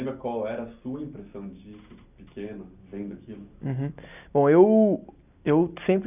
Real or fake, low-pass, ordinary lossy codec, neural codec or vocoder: real; 3.6 kHz; none; none